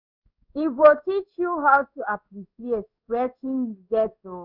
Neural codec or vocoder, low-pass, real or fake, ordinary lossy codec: codec, 16 kHz in and 24 kHz out, 1 kbps, XY-Tokenizer; 5.4 kHz; fake; none